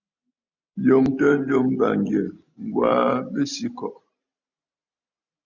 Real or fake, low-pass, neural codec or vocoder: real; 7.2 kHz; none